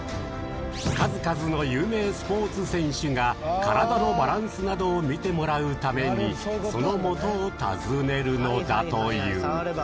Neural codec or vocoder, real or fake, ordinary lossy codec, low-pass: none; real; none; none